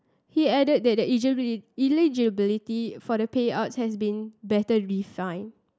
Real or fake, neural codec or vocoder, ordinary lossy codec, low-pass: real; none; none; none